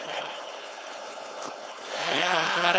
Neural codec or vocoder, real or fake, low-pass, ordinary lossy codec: codec, 16 kHz, 4.8 kbps, FACodec; fake; none; none